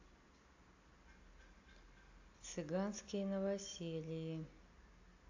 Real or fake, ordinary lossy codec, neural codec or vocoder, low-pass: real; AAC, 48 kbps; none; 7.2 kHz